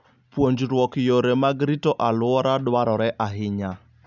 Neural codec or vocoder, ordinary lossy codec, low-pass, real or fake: none; none; 7.2 kHz; real